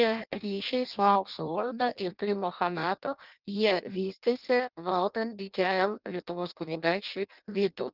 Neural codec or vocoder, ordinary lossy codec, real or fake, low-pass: codec, 16 kHz in and 24 kHz out, 0.6 kbps, FireRedTTS-2 codec; Opus, 24 kbps; fake; 5.4 kHz